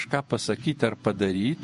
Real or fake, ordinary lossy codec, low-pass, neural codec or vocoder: fake; MP3, 48 kbps; 14.4 kHz; vocoder, 48 kHz, 128 mel bands, Vocos